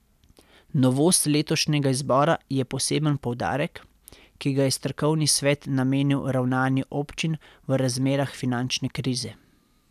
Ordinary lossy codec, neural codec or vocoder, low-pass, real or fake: none; none; 14.4 kHz; real